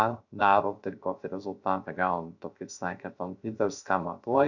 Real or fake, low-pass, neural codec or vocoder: fake; 7.2 kHz; codec, 16 kHz, 0.3 kbps, FocalCodec